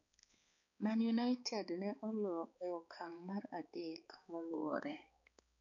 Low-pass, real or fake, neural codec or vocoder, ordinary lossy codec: 7.2 kHz; fake; codec, 16 kHz, 4 kbps, X-Codec, HuBERT features, trained on balanced general audio; none